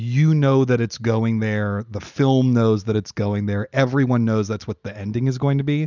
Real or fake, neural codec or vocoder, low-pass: real; none; 7.2 kHz